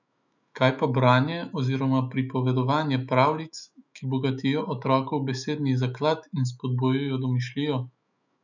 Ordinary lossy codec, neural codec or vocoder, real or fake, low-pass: none; autoencoder, 48 kHz, 128 numbers a frame, DAC-VAE, trained on Japanese speech; fake; 7.2 kHz